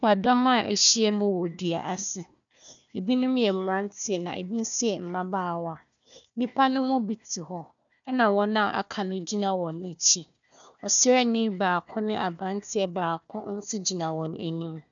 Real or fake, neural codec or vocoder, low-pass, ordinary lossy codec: fake; codec, 16 kHz, 1 kbps, FunCodec, trained on Chinese and English, 50 frames a second; 7.2 kHz; MP3, 96 kbps